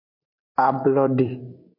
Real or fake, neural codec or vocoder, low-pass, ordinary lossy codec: real; none; 5.4 kHz; MP3, 32 kbps